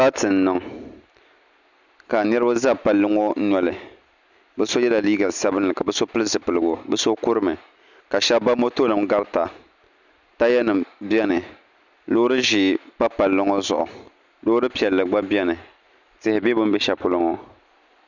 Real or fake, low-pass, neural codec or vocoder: real; 7.2 kHz; none